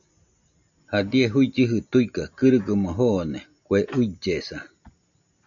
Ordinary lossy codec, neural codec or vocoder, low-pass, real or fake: MP3, 96 kbps; none; 7.2 kHz; real